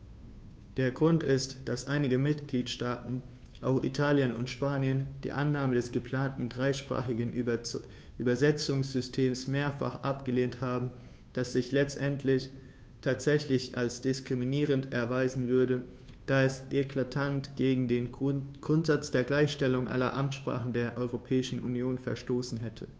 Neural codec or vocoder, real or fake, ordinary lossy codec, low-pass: codec, 16 kHz, 2 kbps, FunCodec, trained on Chinese and English, 25 frames a second; fake; none; none